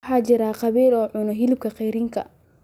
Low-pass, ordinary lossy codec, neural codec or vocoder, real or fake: 19.8 kHz; none; none; real